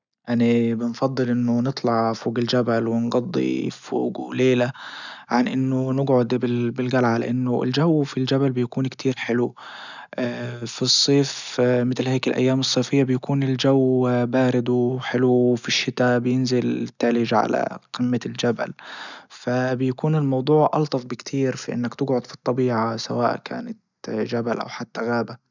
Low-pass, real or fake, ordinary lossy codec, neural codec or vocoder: 7.2 kHz; real; none; none